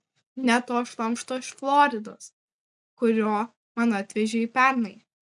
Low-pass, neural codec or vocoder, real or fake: 10.8 kHz; none; real